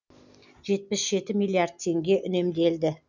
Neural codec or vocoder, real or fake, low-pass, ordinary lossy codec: none; real; 7.2 kHz; none